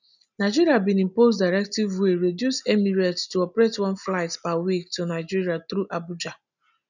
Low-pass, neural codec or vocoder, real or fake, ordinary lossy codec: 7.2 kHz; none; real; none